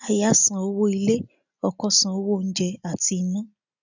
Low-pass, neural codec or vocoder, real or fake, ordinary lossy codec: 7.2 kHz; none; real; none